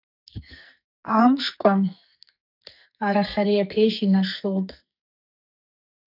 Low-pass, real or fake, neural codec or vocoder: 5.4 kHz; fake; codec, 44.1 kHz, 2.6 kbps, SNAC